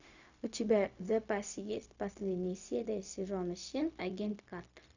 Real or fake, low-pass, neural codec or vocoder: fake; 7.2 kHz; codec, 16 kHz, 0.4 kbps, LongCat-Audio-Codec